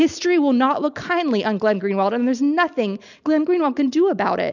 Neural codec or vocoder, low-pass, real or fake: none; 7.2 kHz; real